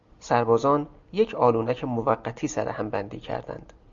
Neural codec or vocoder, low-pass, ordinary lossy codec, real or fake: none; 7.2 kHz; Opus, 64 kbps; real